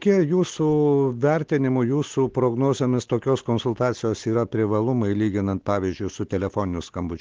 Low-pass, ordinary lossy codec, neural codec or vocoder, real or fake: 7.2 kHz; Opus, 16 kbps; none; real